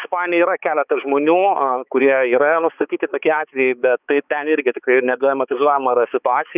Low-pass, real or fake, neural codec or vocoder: 3.6 kHz; fake; codec, 16 kHz, 4 kbps, X-Codec, HuBERT features, trained on balanced general audio